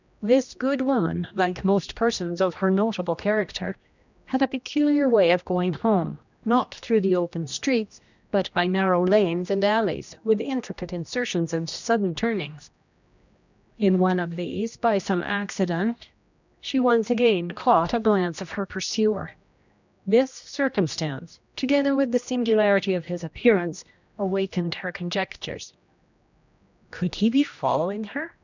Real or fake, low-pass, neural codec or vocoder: fake; 7.2 kHz; codec, 16 kHz, 1 kbps, X-Codec, HuBERT features, trained on general audio